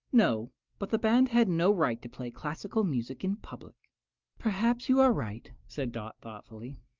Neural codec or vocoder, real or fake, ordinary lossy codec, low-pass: none; real; Opus, 24 kbps; 7.2 kHz